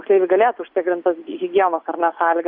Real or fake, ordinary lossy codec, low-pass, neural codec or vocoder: real; Opus, 64 kbps; 5.4 kHz; none